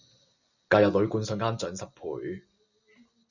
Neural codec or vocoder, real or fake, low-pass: none; real; 7.2 kHz